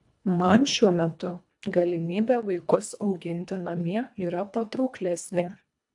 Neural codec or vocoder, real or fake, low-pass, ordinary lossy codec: codec, 24 kHz, 1.5 kbps, HILCodec; fake; 10.8 kHz; AAC, 64 kbps